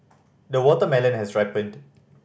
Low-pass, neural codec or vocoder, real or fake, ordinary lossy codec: none; none; real; none